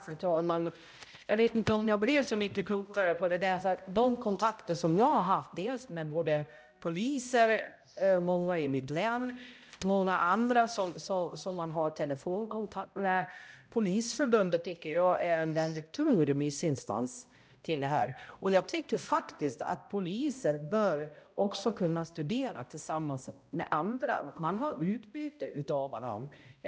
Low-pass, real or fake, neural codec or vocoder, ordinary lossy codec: none; fake; codec, 16 kHz, 0.5 kbps, X-Codec, HuBERT features, trained on balanced general audio; none